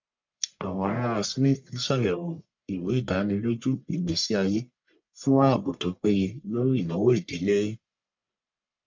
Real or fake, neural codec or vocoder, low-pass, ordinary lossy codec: fake; codec, 44.1 kHz, 1.7 kbps, Pupu-Codec; 7.2 kHz; MP3, 64 kbps